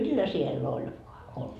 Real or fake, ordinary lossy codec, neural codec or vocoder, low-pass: real; none; none; 14.4 kHz